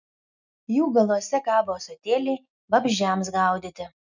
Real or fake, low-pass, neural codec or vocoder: real; 7.2 kHz; none